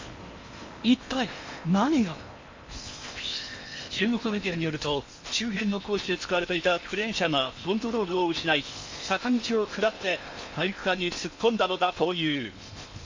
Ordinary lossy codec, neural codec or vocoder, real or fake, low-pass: MP3, 48 kbps; codec, 16 kHz in and 24 kHz out, 0.8 kbps, FocalCodec, streaming, 65536 codes; fake; 7.2 kHz